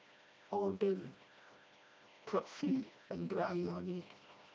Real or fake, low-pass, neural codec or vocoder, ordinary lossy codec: fake; none; codec, 16 kHz, 1 kbps, FreqCodec, smaller model; none